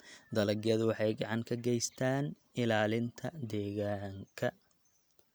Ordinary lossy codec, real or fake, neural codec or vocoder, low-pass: none; real; none; none